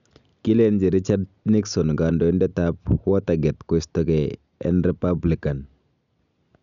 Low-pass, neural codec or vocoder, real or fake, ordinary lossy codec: 7.2 kHz; none; real; none